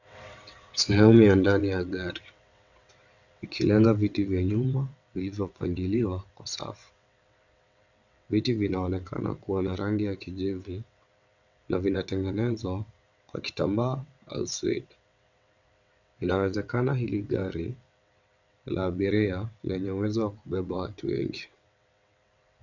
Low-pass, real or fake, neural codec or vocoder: 7.2 kHz; fake; vocoder, 22.05 kHz, 80 mel bands, WaveNeXt